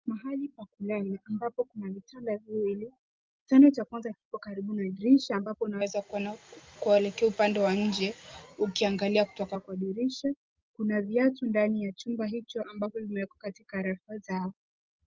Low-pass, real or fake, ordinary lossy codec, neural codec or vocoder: 7.2 kHz; real; Opus, 32 kbps; none